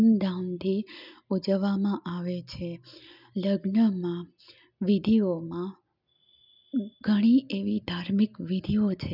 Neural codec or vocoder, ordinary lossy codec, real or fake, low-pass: none; none; real; 5.4 kHz